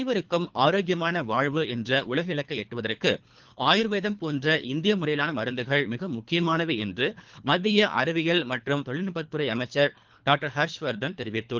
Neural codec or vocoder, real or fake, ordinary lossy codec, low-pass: codec, 24 kHz, 3 kbps, HILCodec; fake; Opus, 32 kbps; 7.2 kHz